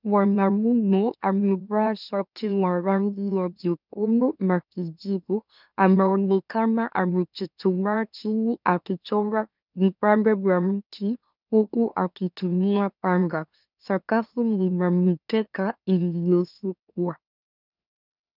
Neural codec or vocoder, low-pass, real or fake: autoencoder, 44.1 kHz, a latent of 192 numbers a frame, MeloTTS; 5.4 kHz; fake